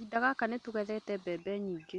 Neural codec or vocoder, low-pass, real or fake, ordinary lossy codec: none; 10.8 kHz; real; none